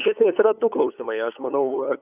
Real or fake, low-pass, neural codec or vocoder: fake; 3.6 kHz; codec, 16 kHz, 8 kbps, FunCodec, trained on LibriTTS, 25 frames a second